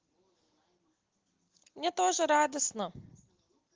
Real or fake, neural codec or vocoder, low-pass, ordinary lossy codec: real; none; 7.2 kHz; Opus, 16 kbps